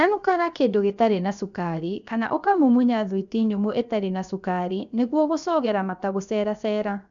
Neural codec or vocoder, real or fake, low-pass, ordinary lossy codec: codec, 16 kHz, 0.7 kbps, FocalCodec; fake; 7.2 kHz; none